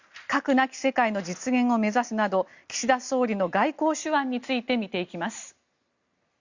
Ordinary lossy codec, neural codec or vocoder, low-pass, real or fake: Opus, 64 kbps; none; 7.2 kHz; real